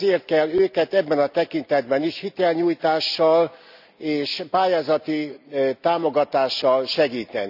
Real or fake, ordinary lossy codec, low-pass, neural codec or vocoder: real; none; 5.4 kHz; none